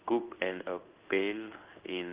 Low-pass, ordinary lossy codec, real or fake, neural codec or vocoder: 3.6 kHz; Opus, 32 kbps; fake; codec, 16 kHz in and 24 kHz out, 1 kbps, XY-Tokenizer